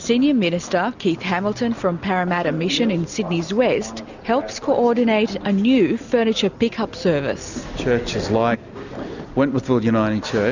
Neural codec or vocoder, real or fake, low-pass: none; real; 7.2 kHz